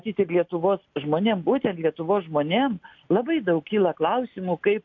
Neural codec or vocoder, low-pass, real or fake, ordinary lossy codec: none; 7.2 kHz; real; AAC, 48 kbps